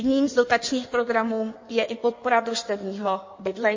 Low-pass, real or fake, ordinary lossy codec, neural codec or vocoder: 7.2 kHz; fake; MP3, 32 kbps; codec, 16 kHz in and 24 kHz out, 1.1 kbps, FireRedTTS-2 codec